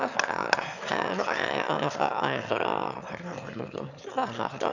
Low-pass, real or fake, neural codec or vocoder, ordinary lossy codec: 7.2 kHz; fake; autoencoder, 22.05 kHz, a latent of 192 numbers a frame, VITS, trained on one speaker; none